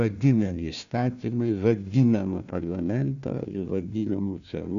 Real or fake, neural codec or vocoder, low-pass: fake; codec, 16 kHz, 1 kbps, FunCodec, trained on Chinese and English, 50 frames a second; 7.2 kHz